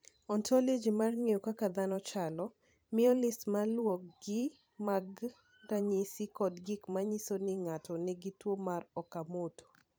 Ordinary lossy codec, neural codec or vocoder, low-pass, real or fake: none; vocoder, 44.1 kHz, 128 mel bands every 512 samples, BigVGAN v2; none; fake